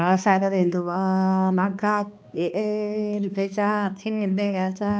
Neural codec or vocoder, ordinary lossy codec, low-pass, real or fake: codec, 16 kHz, 2 kbps, X-Codec, HuBERT features, trained on balanced general audio; none; none; fake